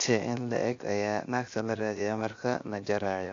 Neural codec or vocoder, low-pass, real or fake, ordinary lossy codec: codec, 16 kHz, 0.7 kbps, FocalCodec; 7.2 kHz; fake; AAC, 48 kbps